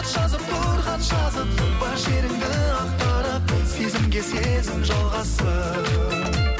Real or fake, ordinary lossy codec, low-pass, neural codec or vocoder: real; none; none; none